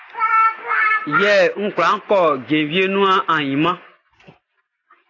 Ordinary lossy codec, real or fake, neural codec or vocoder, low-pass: AAC, 32 kbps; real; none; 7.2 kHz